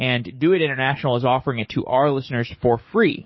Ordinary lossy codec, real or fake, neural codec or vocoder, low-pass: MP3, 24 kbps; fake; codec, 44.1 kHz, 7.8 kbps, DAC; 7.2 kHz